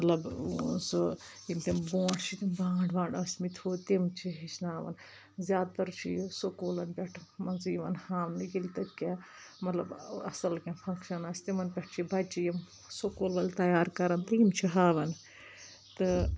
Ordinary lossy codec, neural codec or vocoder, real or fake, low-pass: none; none; real; none